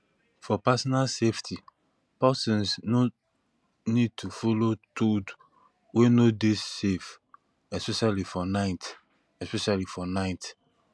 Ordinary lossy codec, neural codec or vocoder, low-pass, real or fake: none; none; none; real